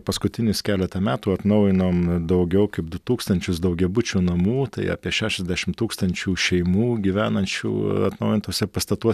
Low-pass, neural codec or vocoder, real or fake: 14.4 kHz; none; real